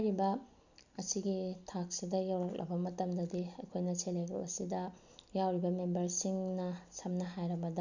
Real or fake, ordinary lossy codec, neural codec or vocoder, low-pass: real; none; none; 7.2 kHz